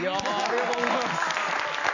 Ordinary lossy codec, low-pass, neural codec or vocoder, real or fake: none; 7.2 kHz; none; real